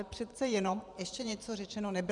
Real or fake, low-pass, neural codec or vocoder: fake; 10.8 kHz; vocoder, 44.1 kHz, 128 mel bands every 512 samples, BigVGAN v2